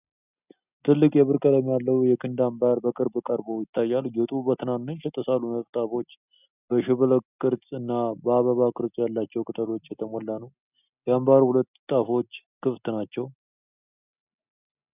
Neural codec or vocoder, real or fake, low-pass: none; real; 3.6 kHz